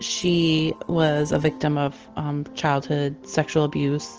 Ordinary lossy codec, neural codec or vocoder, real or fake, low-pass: Opus, 16 kbps; none; real; 7.2 kHz